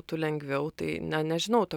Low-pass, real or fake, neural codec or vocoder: 19.8 kHz; real; none